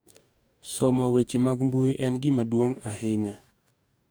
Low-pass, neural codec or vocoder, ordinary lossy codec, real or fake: none; codec, 44.1 kHz, 2.6 kbps, DAC; none; fake